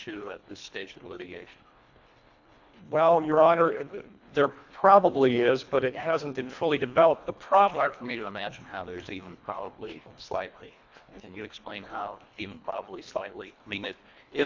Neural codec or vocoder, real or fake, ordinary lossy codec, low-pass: codec, 24 kHz, 1.5 kbps, HILCodec; fake; AAC, 48 kbps; 7.2 kHz